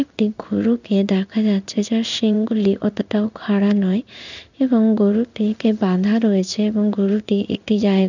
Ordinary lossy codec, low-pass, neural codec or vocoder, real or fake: none; 7.2 kHz; codec, 16 kHz in and 24 kHz out, 1 kbps, XY-Tokenizer; fake